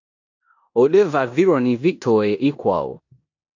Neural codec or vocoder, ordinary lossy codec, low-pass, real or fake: codec, 16 kHz in and 24 kHz out, 0.9 kbps, LongCat-Audio-Codec, four codebook decoder; AAC, 48 kbps; 7.2 kHz; fake